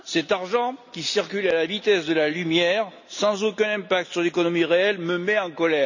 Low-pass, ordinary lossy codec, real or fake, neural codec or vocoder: 7.2 kHz; none; real; none